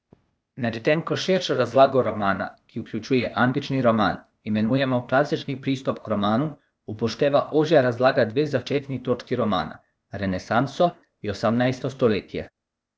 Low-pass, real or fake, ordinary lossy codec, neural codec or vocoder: none; fake; none; codec, 16 kHz, 0.8 kbps, ZipCodec